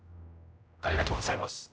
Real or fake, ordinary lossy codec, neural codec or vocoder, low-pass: fake; none; codec, 16 kHz, 0.5 kbps, X-Codec, HuBERT features, trained on general audio; none